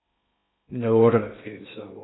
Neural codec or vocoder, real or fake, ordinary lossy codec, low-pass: codec, 16 kHz in and 24 kHz out, 0.6 kbps, FocalCodec, streaming, 2048 codes; fake; AAC, 16 kbps; 7.2 kHz